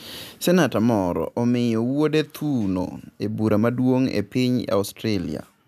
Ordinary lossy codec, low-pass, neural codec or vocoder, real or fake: none; 14.4 kHz; none; real